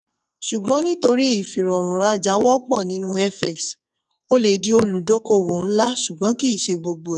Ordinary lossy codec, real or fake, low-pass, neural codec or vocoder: none; fake; 10.8 kHz; codec, 44.1 kHz, 2.6 kbps, SNAC